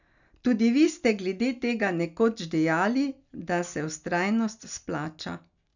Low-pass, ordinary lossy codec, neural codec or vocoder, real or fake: 7.2 kHz; none; none; real